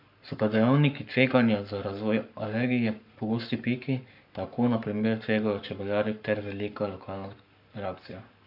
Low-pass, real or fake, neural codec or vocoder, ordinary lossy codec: 5.4 kHz; fake; codec, 44.1 kHz, 7.8 kbps, Pupu-Codec; MP3, 48 kbps